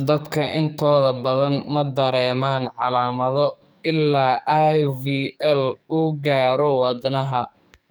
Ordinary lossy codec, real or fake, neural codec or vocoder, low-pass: none; fake; codec, 44.1 kHz, 2.6 kbps, SNAC; none